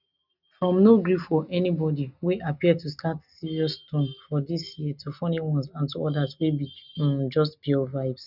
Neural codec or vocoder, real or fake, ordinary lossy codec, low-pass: none; real; none; 5.4 kHz